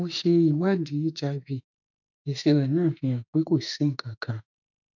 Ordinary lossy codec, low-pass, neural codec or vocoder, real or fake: none; 7.2 kHz; autoencoder, 48 kHz, 32 numbers a frame, DAC-VAE, trained on Japanese speech; fake